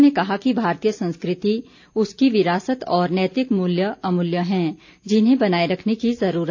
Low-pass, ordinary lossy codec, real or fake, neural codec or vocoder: 7.2 kHz; AAC, 48 kbps; real; none